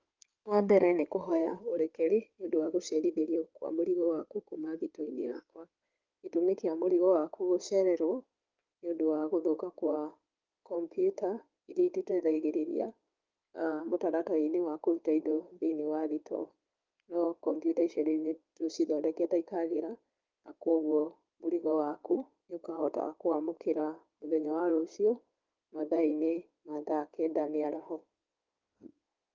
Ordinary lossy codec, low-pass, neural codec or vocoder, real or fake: Opus, 32 kbps; 7.2 kHz; codec, 16 kHz in and 24 kHz out, 2.2 kbps, FireRedTTS-2 codec; fake